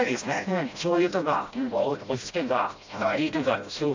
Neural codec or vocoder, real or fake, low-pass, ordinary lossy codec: codec, 16 kHz, 0.5 kbps, FreqCodec, smaller model; fake; 7.2 kHz; AAC, 48 kbps